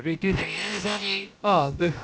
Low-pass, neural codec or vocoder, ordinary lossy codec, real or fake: none; codec, 16 kHz, about 1 kbps, DyCAST, with the encoder's durations; none; fake